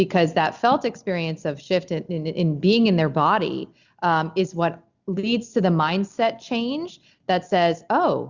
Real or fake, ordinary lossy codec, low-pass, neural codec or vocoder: real; Opus, 64 kbps; 7.2 kHz; none